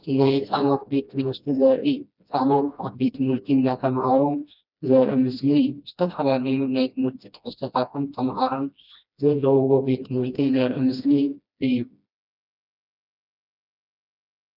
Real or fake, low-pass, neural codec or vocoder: fake; 5.4 kHz; codec, 16 kHz, 1 kbps, FreqCodec, smaller model